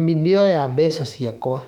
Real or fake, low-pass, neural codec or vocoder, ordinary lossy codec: fake; 19.8 kHz; autoencoder, 48 kHz, 32 numbers a frame, DAC-VAE, trained on Japanese speech; none